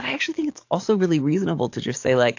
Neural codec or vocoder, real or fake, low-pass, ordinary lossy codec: codec, 44.1 kHz, 7.8 kbps, DAC; fake; 7.2 kHz; AAC, 48 kbps